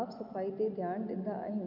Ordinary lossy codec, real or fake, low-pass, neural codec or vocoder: none; real; 5.4 kHz; none